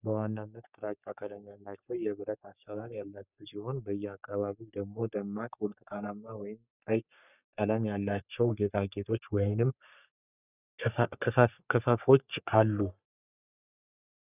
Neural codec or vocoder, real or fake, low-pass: codec, 44.1 kHz, 3.4 kbps, Pupu-Codec; fake; 3.6 kHz